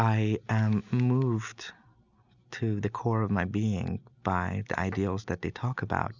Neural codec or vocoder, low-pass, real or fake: none; 7.2 kHz; real